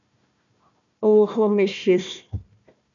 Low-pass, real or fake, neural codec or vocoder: 7.2 kHz; fake; codec, 16 kHz, 1 kbps, FunCodec, trained on Chinese and English, 50 frames a second